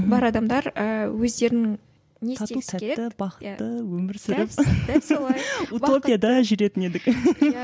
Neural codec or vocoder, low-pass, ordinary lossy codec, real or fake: none; none; none; real